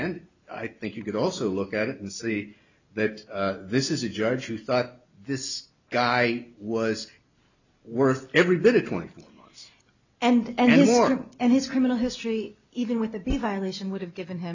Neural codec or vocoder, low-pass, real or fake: none; 7.2 kHz; real